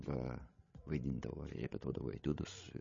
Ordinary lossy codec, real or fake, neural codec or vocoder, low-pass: MP3, 32 kbps; fake; codec, 16 kHz, 16 kbps, FreqCodec, larger model; 7.2 kHz